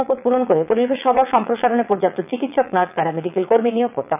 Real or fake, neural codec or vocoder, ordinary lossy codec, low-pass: fake; vocoder, 22.05 kHz, 80 mel bands, Vocos; none; 3.6 kHz